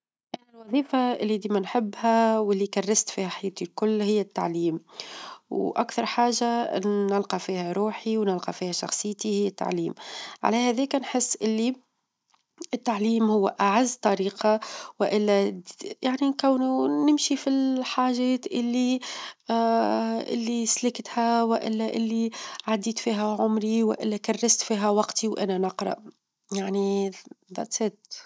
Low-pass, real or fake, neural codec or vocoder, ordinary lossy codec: none; real; none; none